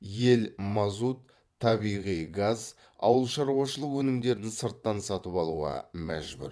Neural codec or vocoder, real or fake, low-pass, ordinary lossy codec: vocoder, 22.05 kHz, 80 mel bands, WaveNeXt; fake; none; none